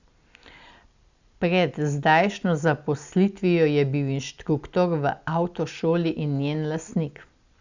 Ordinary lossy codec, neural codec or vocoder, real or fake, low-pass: Opus, 64 kbps; none; real; 7.2 kHz